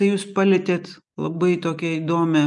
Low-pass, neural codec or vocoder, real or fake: 10.8 kHz; none; real